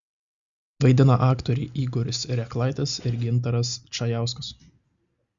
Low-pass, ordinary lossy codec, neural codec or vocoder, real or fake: 7.2 kHz; Opus, 64 kbps; none; real